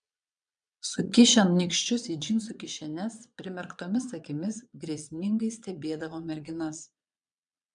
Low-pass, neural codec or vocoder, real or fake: 9.9 kHz; vocoder, 22.05 kHz, 80 mel bands, Vocos; fake